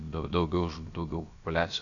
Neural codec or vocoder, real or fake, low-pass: codec, 16 kHz, about 1 kbps, DyCAST, with the encoder's durations; fake; 7.2 kHz